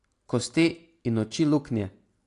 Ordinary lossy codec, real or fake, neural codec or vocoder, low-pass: AAC, 48 kbps; real; none; 10.8 kHz